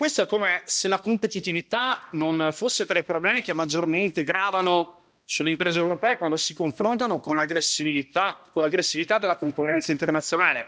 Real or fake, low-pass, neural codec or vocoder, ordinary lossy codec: fake; none; codec, 16 kHz, 1 kbps, X-Codec, HuBERT features, trained on balanced general audio; none